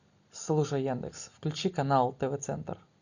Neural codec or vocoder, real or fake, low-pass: none; real; 7.2 kHz